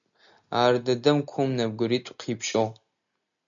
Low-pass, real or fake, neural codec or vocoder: 7.2 kHz; real; none